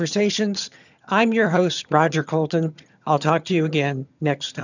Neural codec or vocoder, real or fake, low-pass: vocoder, 22.05 kHz, 80 mel bands, HiFi-GAN; fake; 7.2 kHz